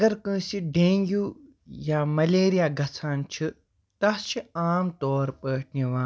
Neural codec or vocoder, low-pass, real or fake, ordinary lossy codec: none; none; real; none